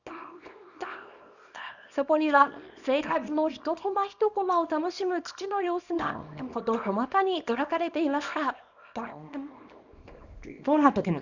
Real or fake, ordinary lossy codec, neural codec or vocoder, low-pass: fake; none; codec, 24 kHz, 0.9 kbps, WavTokenizer, small release; 7.2 kHz